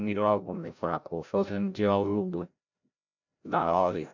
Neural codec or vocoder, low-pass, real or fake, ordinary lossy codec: codec, 16 kHz, 0.5 kbps, FreqCodec, larger model; 7.2 kHz; fake; none